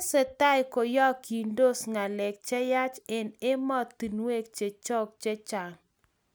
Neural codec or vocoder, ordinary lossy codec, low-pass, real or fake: none; none; none; real